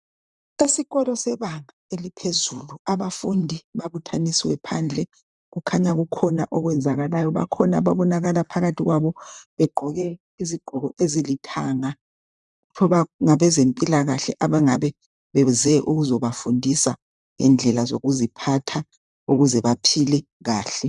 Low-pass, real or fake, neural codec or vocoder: 10.8 kHz; fake; vocoder, 44.1 kHz, 128 mel bands, Pupu-Vocoder